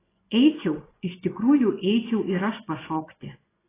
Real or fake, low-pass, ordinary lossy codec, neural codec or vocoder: fake; 3.6 kHz; AAC, 16 kbps; vocoder, 24 kHz, 100 mel bands, Vocos